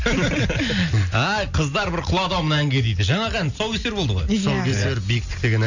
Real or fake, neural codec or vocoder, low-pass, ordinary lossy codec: real; none; 7.2 kHz; none